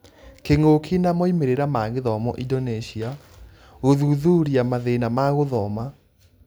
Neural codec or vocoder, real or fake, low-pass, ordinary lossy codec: none; real; none; none